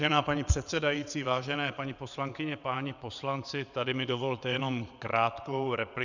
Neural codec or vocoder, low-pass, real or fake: vocoder, 22.05 kHz, 80 mel bands, WaveNeXt; 7.2 kHz; fake